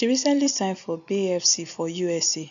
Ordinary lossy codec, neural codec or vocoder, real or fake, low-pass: none; none; real; 7.2 kHz